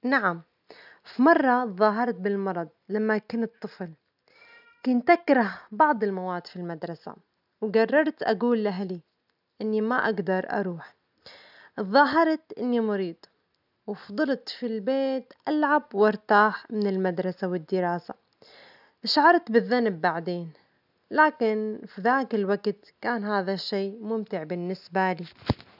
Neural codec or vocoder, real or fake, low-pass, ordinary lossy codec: none; real; 5.4 kHz; none